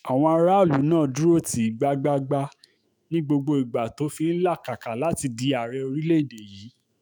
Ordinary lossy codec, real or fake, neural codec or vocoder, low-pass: none; fake; autoencoder, 48 kHz, 128 numbers a frame, DAC-VAE, trained on Japanese speech; none